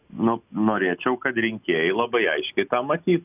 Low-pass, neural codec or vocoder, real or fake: 3.6 kHz; none; real